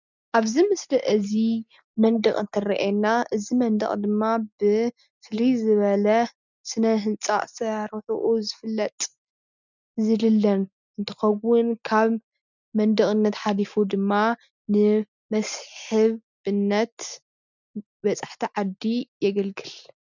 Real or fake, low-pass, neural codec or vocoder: real; 7.2 kHz; none